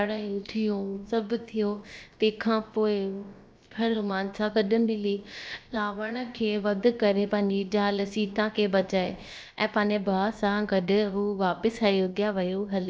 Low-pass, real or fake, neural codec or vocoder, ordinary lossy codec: none; fake; codec, 16 kHz, about 1 kbps, DyCAST, with the encoder's durations; none